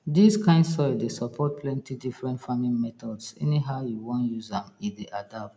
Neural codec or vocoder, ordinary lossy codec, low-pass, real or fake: none; none; none; real